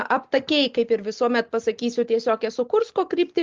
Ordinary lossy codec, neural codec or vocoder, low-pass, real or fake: Opus, 32 kbps; none; 7.2 kHz; real